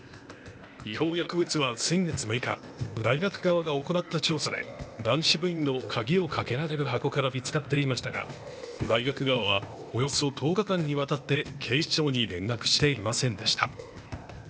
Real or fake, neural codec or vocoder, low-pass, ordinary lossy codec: fake; codec, 16 kHz, 0.8 kbps, ZipCodec; none; none